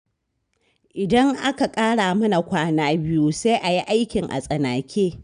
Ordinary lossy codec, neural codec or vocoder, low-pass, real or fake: none; none; 9.9 kHz; real